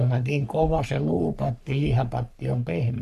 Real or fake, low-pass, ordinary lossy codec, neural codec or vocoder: fake; 14.4 kHz; none; codec, 44.1 kHz, 3.4 kbps, Pupu-Codec